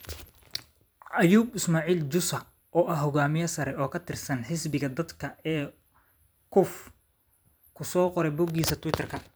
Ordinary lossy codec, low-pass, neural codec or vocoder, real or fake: none; none; vocoder, 44.1 kHz, 128 mel bands every 256 samples, BigVGAN v2; fake